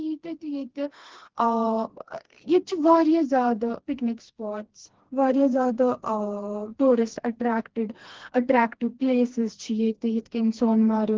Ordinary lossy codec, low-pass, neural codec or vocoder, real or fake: Opus, 16 kbps; 7.2 kHz; codec, 16 kHz, 2 kbps, FreqCodec, smaller model; fake